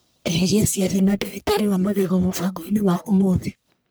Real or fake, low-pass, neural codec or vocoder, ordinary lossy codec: fake; none; codec, 44.1 kHz, 1.7 kbps, Pupu-Codec; none